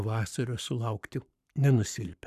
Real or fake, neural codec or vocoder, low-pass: fake; codec, 44.1 kHz, 7.8 kbps, Pupu-Codec; 14.4 kHz